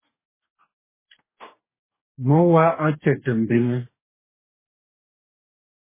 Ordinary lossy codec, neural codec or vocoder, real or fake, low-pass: MP3, 16 kbps; codec, 44.1 kHz, 2.6 kbps, DAC; fake; 3.6 kHz